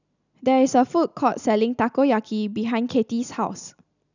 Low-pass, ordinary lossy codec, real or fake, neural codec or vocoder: 7.2 kHz; none; real; none